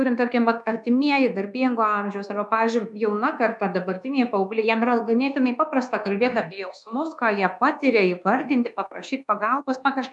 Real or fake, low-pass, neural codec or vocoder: fake; 10.8 kHz; codec, 24 kHz, 1.2 kbps, DualCodec